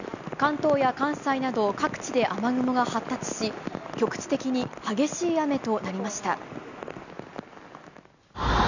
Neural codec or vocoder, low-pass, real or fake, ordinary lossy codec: none; 7.2 kHz; real; none